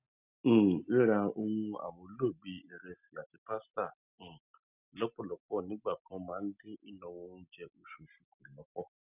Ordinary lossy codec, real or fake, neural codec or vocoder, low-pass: none; real; none; 3.6 kHz